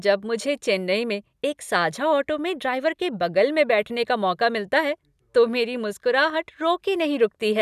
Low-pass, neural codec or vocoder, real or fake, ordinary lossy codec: 14.4 kHz; none; real; none